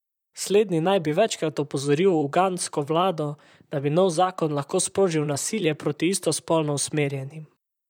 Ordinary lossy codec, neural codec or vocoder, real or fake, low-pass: none; vocoder, 44.1 kHz, 128 mel bands, Pupu-Vocoder; fake; 19.8 kHz